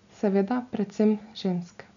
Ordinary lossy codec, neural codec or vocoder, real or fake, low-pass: none; none; real; 7.2 kHz